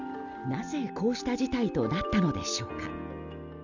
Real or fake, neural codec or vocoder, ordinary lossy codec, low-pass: real; none; none; 7.2 kHz